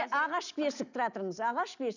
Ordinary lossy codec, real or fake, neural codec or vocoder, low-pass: none; real; none; 7.2 kHz